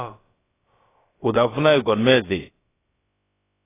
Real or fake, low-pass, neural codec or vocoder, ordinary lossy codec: fake; 3.6 kHz; codec, 16 kHz, about 1 kbps, DyCAST, with the encoder's durations; AAC, 16 kbps